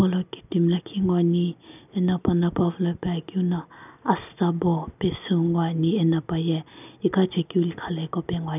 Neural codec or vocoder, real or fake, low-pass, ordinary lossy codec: none; real; 3.6 kHz; none